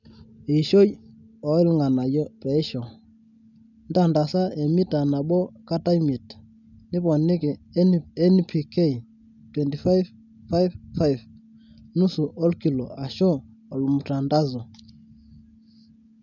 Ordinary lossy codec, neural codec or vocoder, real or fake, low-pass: none; none; real; 7.2 kHz